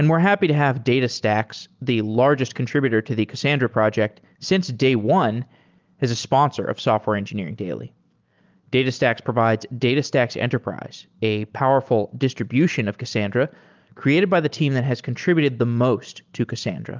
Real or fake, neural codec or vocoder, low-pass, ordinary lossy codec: real; none; 7.2 kHz; Opus, 24 kbps